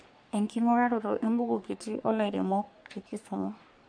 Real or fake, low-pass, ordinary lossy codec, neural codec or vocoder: fake; 9.9 kHz; none; codec, 44.1 kHz, 3.4 kbps, Pupu-Codec